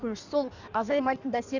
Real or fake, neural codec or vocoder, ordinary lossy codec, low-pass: fake; codec, 16 kHz in and 24 kHz out, 1.1 kbps, FireRedTTS-2 codec; none; 7.2 kHz